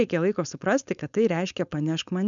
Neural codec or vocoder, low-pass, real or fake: codec, 16 kHz, 4.8 kbps, FACodec; 7.2 kHz; fake